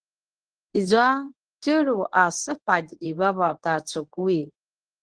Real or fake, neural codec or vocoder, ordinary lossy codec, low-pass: fake; codec, 24 kHz, 0.9 kbps, WavTokenizer, medium speech release version 1; Opus, 16 kbps; 9.9 kHz